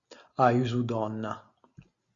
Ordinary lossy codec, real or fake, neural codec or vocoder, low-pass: Opus, 64 kbps; real; none; 7.2 kHz